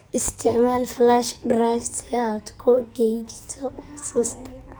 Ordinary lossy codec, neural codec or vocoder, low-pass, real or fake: none; codec, 44.1 kHz, 2.6 kbps, SNAC; none; fake